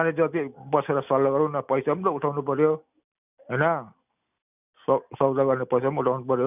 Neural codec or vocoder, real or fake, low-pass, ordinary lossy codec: none; real; 3.6 kHz; none